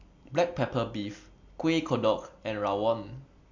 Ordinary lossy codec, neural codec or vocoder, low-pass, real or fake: MP3, 48 kbps; none; 7.2 kHz; real